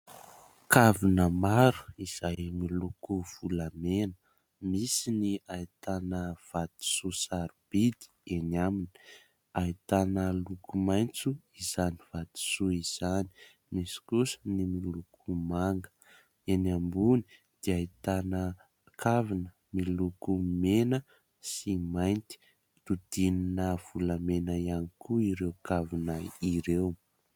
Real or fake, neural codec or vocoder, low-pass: real; none; 19.8 kHz